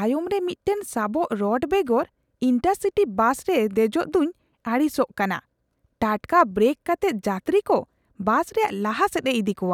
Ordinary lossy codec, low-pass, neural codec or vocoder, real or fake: none; 19.8 kHz; none; real